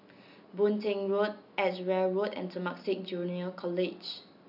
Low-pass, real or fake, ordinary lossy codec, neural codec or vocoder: 5.4 kHz; real; none; none